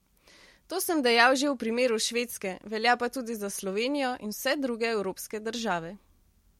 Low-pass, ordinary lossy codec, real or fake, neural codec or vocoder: 19.8 kHz; MP3, 64 kbps; real; none